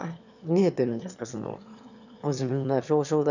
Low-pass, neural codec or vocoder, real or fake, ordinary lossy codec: 7.2 kHz; autoencoder, 22.05 kHz, a latent of 192 numbers a frame, VITS, trained on one speaker; fake; none